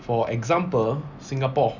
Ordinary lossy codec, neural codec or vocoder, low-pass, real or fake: none; none; 7.2 kHz; real